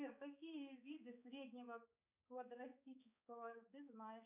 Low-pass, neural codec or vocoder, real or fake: 3.6 kHz; codec, 16 kHz, 4 kbps, X-Codec, HuBERT features, trained on balanced general audio; fake